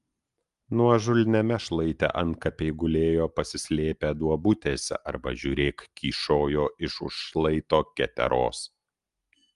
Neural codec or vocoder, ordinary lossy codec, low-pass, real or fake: none; Opus, 32 kbps; 10.8 kHz; real